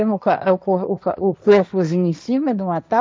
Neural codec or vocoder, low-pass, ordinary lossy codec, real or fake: codec, 16 kHz, 1.1 kbps, Voila-Tokenizer; none; none; fake